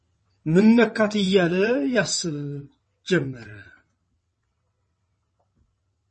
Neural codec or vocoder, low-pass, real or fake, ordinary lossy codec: vocoder, 22.05 kHz, 80 mel bands, WaveNeXt; 9.9 kHz; fake; MP3, 32 kbps